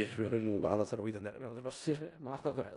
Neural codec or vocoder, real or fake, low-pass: codec, 16 kHz in and 24 kHz out, 0.4 kbps, LongCat-Audio-Codec, four codebook decoder; fake; 10.8 kHz